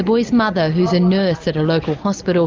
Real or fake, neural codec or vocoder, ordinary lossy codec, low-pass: real; none; Opus, 24 kbps; 7.2 kHz